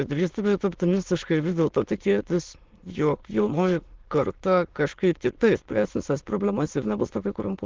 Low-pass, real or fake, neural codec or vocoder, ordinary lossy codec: 7.2 kHz; fake; autoencoder, 22.05 kHz, a latent of 192 numbers a frame, VITS, trained on many speakers; Opus, 16 kbps